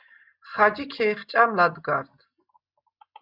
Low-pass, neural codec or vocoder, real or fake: 5.4 kHz; none; real